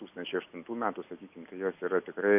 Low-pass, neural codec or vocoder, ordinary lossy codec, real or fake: 3.6 kHz; none; AAC, 32 kbps; real